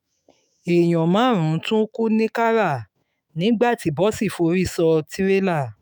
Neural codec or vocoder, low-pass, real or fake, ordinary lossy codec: autoencoder, 48 kHz, 128 numbers a frame, DAC-VAE, trained on Japanese speech; none; fake; none